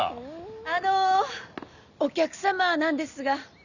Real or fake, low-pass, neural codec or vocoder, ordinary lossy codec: real; 7.2 kHz; none; none